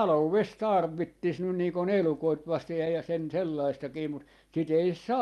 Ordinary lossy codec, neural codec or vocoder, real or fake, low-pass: Opus, 32 kbps; none; real; 14.4 kHz